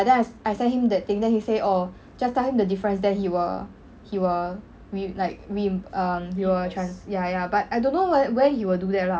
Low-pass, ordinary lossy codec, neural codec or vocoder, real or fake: none; none; none; real